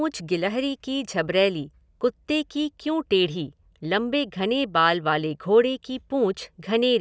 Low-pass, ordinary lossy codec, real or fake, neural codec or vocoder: none; none; real; none